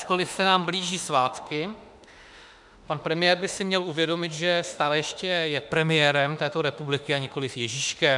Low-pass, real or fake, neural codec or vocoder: 10.8 kHz; fake; autoencoder, 48 kHz, 32 numbers a frame, DAC-VAE, trained on Japanese speech